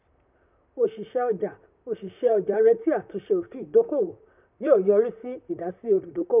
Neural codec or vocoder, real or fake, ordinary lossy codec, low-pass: vocoder, 44.1 kHz, 128 mel bands, Pupu-Vocoder; fake; none; 3.6 kHz